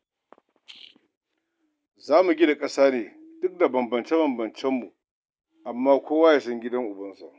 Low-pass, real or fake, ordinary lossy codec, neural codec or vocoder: none; real; none; none